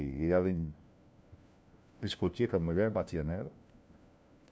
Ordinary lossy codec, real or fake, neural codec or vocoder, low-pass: none; fake; codec, 16 kHz, 1 kbps, FunCodec, trained on LibriTTS, 50 frames a second; none